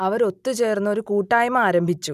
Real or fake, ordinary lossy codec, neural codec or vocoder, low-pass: real; none; none; 14.4 kHz